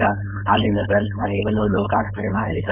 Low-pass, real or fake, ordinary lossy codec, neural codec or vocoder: 3.6 kHz; fake; none; codec, 16 kHz, 4.8 kbps, FACodec